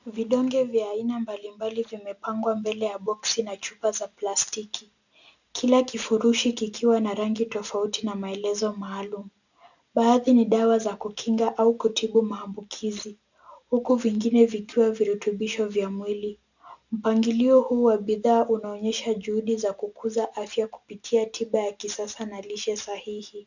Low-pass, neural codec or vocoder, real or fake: 7.2 kHz; none; real